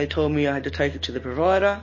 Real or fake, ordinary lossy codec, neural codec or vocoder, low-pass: real; MP3, 32 kbps; none; 7.2 kHz